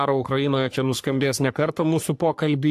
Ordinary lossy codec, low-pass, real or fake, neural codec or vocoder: AAC, 64 kbps; 14.4 kHz; fake; codec, 44.1 kHz, 3.4 kbps, Pupu-Codec